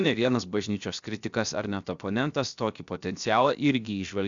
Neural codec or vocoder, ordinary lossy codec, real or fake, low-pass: codec, 16 kHz, 0.7 kbps, FocalCodec; Opus, 64 kbps; fake; 7.2 kHz